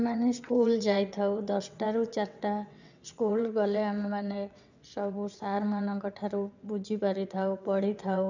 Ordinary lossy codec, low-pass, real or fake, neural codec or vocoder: none; 7.2 kHz; fake; vocoder, 22.05 kHz, 80 mel bands, WaveNeXt